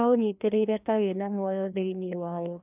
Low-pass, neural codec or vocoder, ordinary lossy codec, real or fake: 3.6 kHz; codec, 16 kHz, 1 kbps, FreqCodec, larger model; none; fake